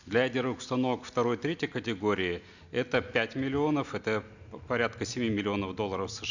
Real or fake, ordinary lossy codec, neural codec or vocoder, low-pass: real; none; none; 7.2 kHz